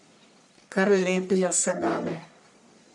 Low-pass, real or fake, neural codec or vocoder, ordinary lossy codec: 10.8 kHz; fake; codec, 44.1 kHz, 1.7 kbps, Pupu-Codec; none